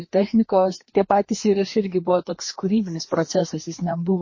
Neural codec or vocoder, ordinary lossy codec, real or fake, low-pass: codec, 16 kHz, 2 kbps, FreqCodec, larger model; MP3, 32 kbps; fake; 7.2 kHz